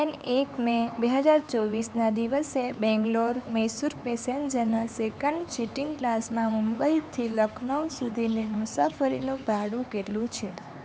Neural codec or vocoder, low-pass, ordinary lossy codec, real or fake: codec, 16 kHz, 4 kbps, X-Codec, HuBERT features, trained on LibriSpeech; none; none; fake